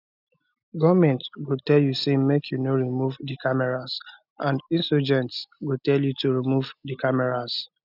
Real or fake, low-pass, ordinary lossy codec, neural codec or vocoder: real; 5.4 kHz; none; none